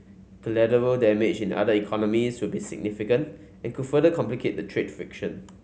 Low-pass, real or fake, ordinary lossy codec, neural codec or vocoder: none; real; none; none